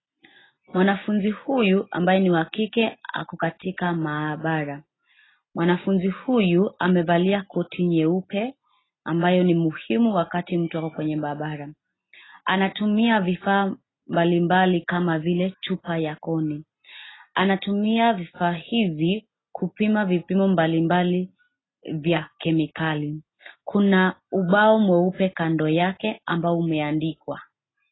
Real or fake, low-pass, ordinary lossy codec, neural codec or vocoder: real; 7.2 kHz; AAC, 16 kbps; none